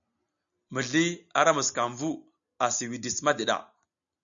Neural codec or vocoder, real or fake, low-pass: none; real; 7.2 kHz